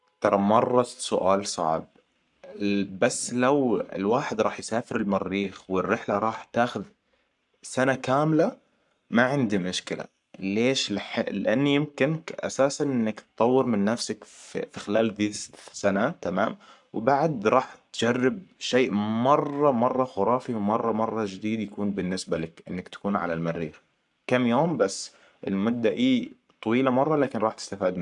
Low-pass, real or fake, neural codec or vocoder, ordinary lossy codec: 10.8 kHz; fake; codec, 44.1 kHz, 7.8 kbps, Pupu-Codec; none